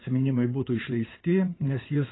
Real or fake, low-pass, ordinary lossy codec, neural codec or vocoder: fake; 7.2 kHz; AAC, 16 kbps; codec, 16 kHz in and 24 kHz out, 2.2 kbps, FireRedTTS-2 codec